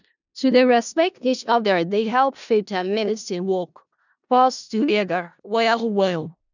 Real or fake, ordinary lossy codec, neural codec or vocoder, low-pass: fake; none; codec, 16 kHz in and 24 kHz out, 0.4 kbps, LongCat-Audio-Codec, four codebook decoder; 7.2 kHz